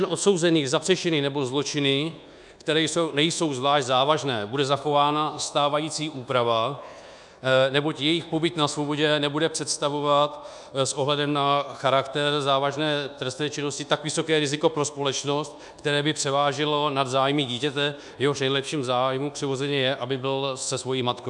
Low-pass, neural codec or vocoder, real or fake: 10.8 kHz; codec, 24 kHz, 1.2 kbps, DualCodec; fake